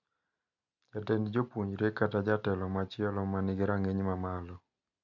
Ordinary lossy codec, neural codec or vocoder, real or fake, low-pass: MP3, 64 kbps; none; real; 7.2 kHz